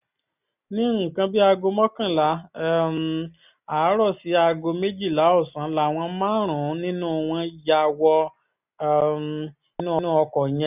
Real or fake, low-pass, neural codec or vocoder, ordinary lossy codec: real; 3.6 kHz; none; none